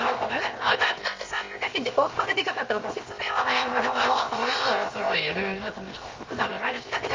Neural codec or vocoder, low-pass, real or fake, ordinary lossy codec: codec, 16 kHz, 0.7 kbps, FocalCodec; 7.2 kHz; fake; Opus, 32 kbps